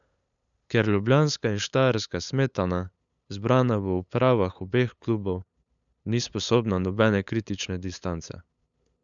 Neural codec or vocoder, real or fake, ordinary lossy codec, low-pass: codec, 16 kHz, 8 kbps, FunCodec, trained on LibriTTS, 25 frames a second; fake; none; 7.2 kHz